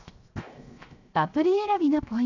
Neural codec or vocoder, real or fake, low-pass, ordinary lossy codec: codec, 16 kHz, 0.7 kbps, FocalCodec; fake; 7.2 kHz; none